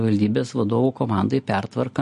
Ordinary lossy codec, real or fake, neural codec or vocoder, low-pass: MP3, 48 kbps; real; none; 10.8 kHz